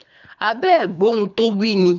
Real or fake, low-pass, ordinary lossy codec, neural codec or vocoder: fake; 7.2 kHz; none; codec, 24 kHz, 3 kbps, HILCodec